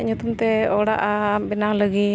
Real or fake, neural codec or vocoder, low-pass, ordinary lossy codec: real; none; none; none